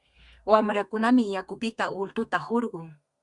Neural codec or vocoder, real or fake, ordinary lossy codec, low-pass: codec, 32 kHz, 1.9 kbps, SNAC; fake; Opus, 64 kbps; 10.8 kHz